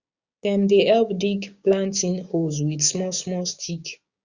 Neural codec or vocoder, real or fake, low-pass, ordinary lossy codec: codec, 16 kHz, 6 kbps, DAC; fake; 7.2 kHz; Opus, 64 kbps